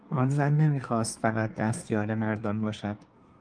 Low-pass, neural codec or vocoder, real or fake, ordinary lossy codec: 9.9 kHz; codec, 24 kHz, 1 kbps, SNAC; fake; Opus, 32 kbps